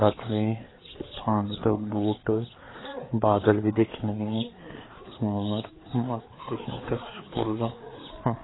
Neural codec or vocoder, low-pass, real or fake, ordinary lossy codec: codec, 16 kHz, 4 kbps, FreqCodec, larger model; 7.2 kHz; fake; AAC, 16 kbps